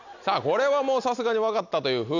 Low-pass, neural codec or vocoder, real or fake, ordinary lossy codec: 7.2 kHz; none; real; none